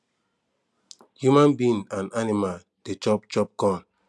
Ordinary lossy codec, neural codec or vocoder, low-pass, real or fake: none; none; none; real